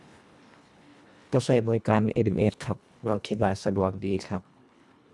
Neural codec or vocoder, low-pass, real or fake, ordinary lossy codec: codec, 24 kHz, 1.5 kbps, HILCodec; none; fake; none